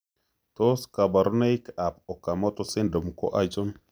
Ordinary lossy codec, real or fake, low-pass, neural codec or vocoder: none; real; none; none